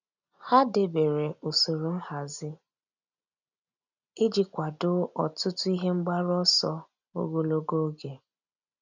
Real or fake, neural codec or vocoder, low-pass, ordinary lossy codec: real; none; 7.2 kHz; none